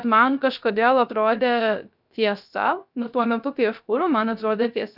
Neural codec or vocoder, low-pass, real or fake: codec, 16 kHz, 0.3 kbps, FocalCodec; 5.4 kHz; fake